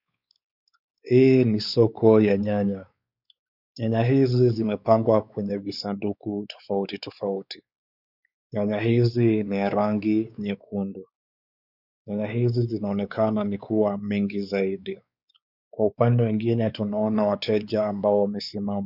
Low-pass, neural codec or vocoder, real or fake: 5.4 kHz; codec, 16 kHz, 4 kbps, X-Codec, WavLM features, trained on Multilingual LibriSpeech; fake